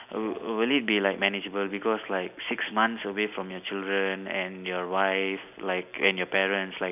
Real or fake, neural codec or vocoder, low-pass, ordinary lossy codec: real; none; 3.6 kHz; none